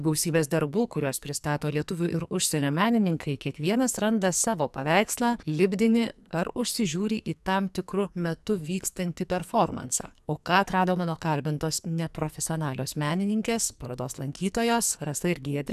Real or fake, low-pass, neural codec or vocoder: fake; 14.4 kHz; codec, 44.1 kHz, 2.6 kbps, SNAC